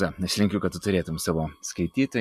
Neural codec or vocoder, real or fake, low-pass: none; real; 14.4 kHz